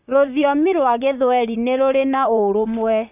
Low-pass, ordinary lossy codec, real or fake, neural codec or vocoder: 3.6 kHz; none; fake; vocoder, 44.1 kHz, 128 mel bands, Pupu-Vocoder